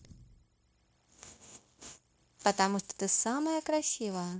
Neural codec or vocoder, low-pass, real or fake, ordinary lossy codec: codec, 16 kHz, 0.9 kbps, LongCat-Audio-Codec; none; fake; none